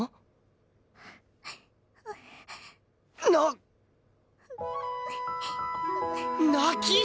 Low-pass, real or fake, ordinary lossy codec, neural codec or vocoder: none; real; none; none